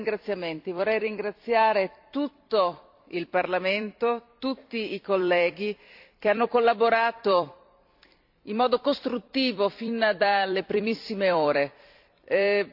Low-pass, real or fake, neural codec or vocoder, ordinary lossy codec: 5.4 kHz; fake; vocoder, 44.1 kHz, 128 mel bands every 256 samples, BigVGAN v2; AAC, 48 kbps